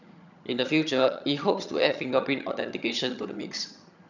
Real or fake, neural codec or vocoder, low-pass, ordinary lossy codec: fake; vocoder, 22.05 kHz, 80 mel bands, HiFi-GAN; 7.2 kHz; none